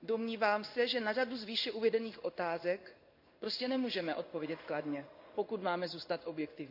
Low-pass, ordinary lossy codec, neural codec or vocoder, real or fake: 5.4 kHz; none; codec, 16 kHz in and 24 kHz out, 1 kbps, XY-Tokenizer; fake